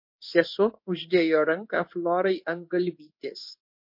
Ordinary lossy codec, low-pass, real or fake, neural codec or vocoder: MP3, 32 kbps; 5.4 kHz; fake; codec, 16 kHz in and 24 kHz out, 1 kbps, XY-Tokenizer